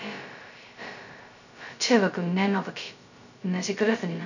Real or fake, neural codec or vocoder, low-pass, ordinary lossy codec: fake; codec, 16 kHz, 0.2 kbps, FocalCodec; 7.2 kHz; none